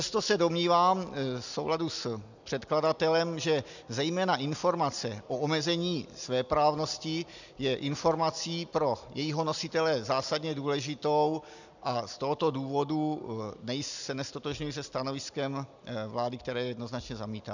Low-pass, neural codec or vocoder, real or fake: 7.2 kHz; none; real